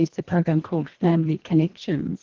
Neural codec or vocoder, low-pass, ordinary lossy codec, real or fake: codec, 24 kHz, 1.5 kbps, HILCodec; 7.2 kHz; Opus, 16 kbps; fake